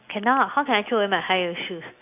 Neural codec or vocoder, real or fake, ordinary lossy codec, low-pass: none; real; AAC, 32 kbps; 3.6 kHz